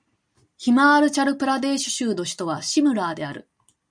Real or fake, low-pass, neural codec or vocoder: real; 9.9 kHz; none